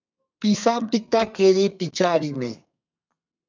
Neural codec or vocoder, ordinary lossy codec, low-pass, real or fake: codec, 32 kHz, 1.9 kbps, SNAC; MP3, 64 kbps; 7.2 kHz; fake